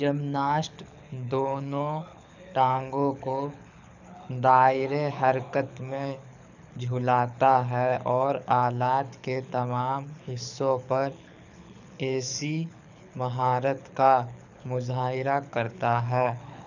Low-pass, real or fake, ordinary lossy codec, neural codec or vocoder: 7.2 kHz; fake; none; codec, 24 kHz, 6 kbps, HILCodec